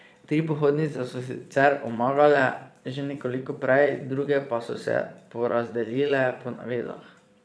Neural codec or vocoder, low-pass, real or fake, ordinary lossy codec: vocoder, 22.05 kHz, 80 mel bands, Vocos; none; fake; none